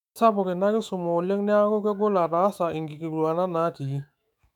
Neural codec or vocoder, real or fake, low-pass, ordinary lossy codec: autoencoder, 48 kHz, 128 numbers a frame, DAC-VAE, trained on Japanese speech; fake; 19.8 kHz; none